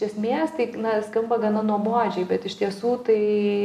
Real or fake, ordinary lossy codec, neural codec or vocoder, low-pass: real; AAC, 96 kbps; none; 14.4 kHz